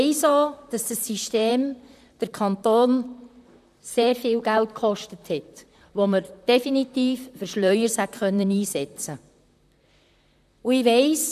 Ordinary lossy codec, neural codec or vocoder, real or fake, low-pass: none; vocoder, 44.1 kHz, 128 mel bands, Pupu-Vocoder; fake; 14.4 kHz